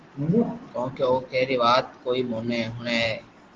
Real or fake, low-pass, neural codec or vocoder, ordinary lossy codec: real; 7.2 kHz; none; Opus, 16 kbps